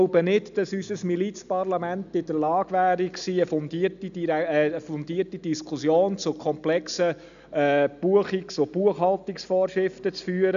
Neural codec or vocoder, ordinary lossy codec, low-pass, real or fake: none; none; 7.2 kHz; real